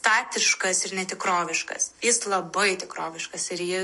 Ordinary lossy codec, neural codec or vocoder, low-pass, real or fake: MP3, 48 kbps; none; 14.4 kHz; real